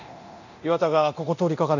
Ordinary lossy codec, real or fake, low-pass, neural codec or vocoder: none; fake; 7.2 kHz; codec, 24 kHz, 0.9 kbps, DualCodec